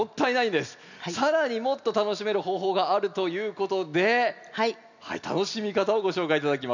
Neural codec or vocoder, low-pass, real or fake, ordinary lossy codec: none; 7.2 kHz; real; none